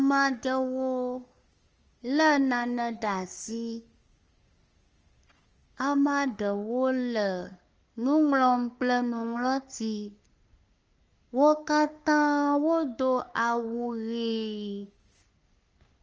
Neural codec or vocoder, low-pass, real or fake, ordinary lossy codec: codec, 44.1 kHz, 3.4 kbps, Pupu-Codec; 7.2 kHz; fake; Opus, 24 kbps